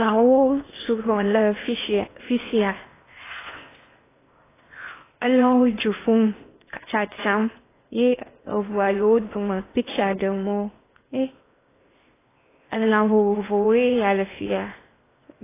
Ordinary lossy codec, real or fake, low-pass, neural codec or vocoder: AAC, 16 kbps; fake; 3.6 kHz; codec, 16 kHz in and 24 kHz out, 0.8 kbps, FocalCodec, streaming, 65536 codes